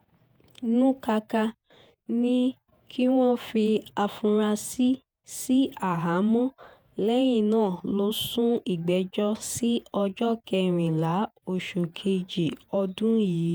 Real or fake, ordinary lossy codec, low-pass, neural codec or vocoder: fake; none; none; vocoder, 48 kHz, 128 mel bands, Vocos